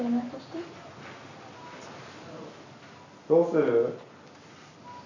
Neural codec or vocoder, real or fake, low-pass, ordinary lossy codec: none; real; 7.2 kHz; none